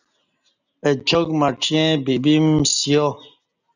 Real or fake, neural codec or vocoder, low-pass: real; none; 7.2 kHz